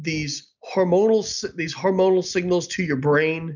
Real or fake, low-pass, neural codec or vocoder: real; 7.2 kHz; none